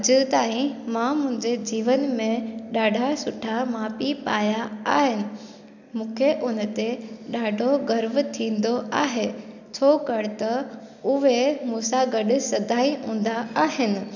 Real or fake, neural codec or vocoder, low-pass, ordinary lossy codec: real; none; 7.2 kHz; none